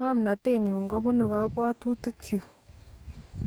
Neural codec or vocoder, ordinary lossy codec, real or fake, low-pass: codec, 44.1 kHz, 2.6 kbps, DAC; none; fake; none